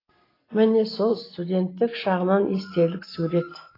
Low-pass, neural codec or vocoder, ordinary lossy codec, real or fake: 5.4 kHz; none; AAC, 24 kbps; real